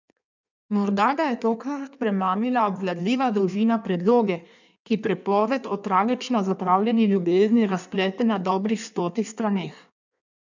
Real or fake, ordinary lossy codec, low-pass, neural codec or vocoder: fake; none; 7.2 kHz; codec, 16 kHz in and 24 kHz out, 1.1 kbps, FireRedTTS-2 codec